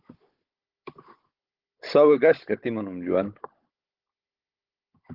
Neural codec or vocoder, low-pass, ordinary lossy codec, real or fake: codec, 16 kHz, 16 kbps, FunCodec, trained on Chinese and English, 50 frames a second; 5.4 kHz; Opus, 16 kbps; fake